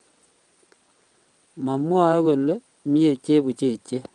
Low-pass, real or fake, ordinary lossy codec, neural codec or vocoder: 9.9 kHz; fake; Opus, 32 kbps; vocoder, 22.05 kHz, 80 mel bands, WaveNeXt